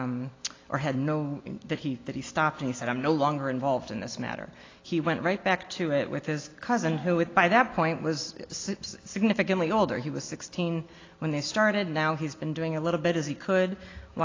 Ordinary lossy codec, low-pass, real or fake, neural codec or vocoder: AAC, 32 kbps; 7.2 kHz; real; none